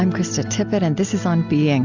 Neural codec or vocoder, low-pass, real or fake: none; 7.2 kHz; real